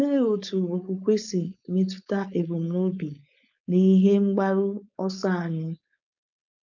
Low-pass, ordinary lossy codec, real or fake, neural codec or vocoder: 7.2 kHz; none; fake; codec, 16 kHz, 4.8 kbps, FACodec